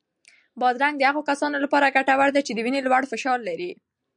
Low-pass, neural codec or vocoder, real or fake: 9.9 kHz; none; real